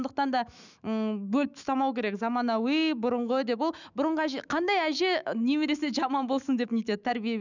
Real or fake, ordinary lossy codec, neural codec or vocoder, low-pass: fake; none; autoencoder, 48 kHz, 128 numbers a frame, DAC-VAE, trained on Japanese speech; 7.2 kHz